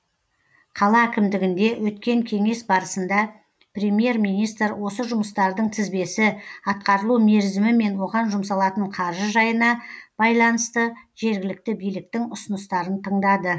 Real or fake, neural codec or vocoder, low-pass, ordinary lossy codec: real; none; none; none